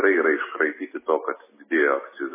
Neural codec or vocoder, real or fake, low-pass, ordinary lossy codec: none; real; 3.6 kHz; MP3, 16 kbps